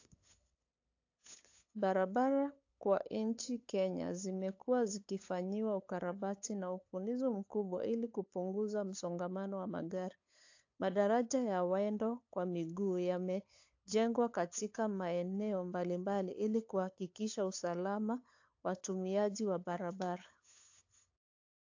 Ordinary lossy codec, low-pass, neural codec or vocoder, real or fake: AAC, 48 kbps; 7.2 kHz; codec, 16 kHz, 8 kbps, FunCodec, trained on LibriTTS, 25 frames a second; fake